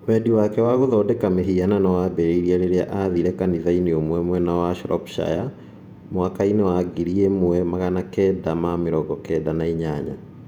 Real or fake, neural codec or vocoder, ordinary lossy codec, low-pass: fake; vocoder, 48 kHz, 128 mel bands, Vocos; none; 19.8 kHz